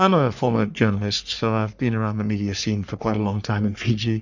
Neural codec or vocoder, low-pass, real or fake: codec, 44.1 kHz, 3.4 kbps, Pupu-Codec; 7.2 kHz; fake